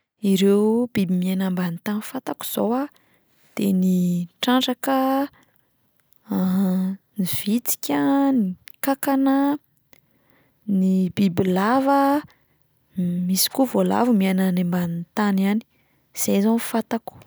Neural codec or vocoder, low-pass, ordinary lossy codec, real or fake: none; none; none; real